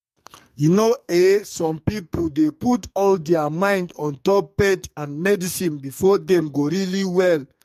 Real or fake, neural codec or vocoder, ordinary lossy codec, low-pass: fake; codec, 44.1 kHz, 2.6 kbps, SNAC; MP3, 64 kbps; 14.4 kHz